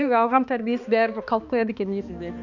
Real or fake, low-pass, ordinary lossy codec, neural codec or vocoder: fake; 7.2 kHz; none; codec, 16 kHz, 2 kbps, X-Codec, HuBERT features, trained on balanced general audio